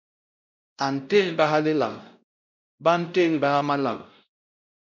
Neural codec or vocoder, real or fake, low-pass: codec, 16 kHz, 0.5 kbps, X-Codec, WavLM features, trained on Multilingual LibriSpeech; fake; 7.2 kHz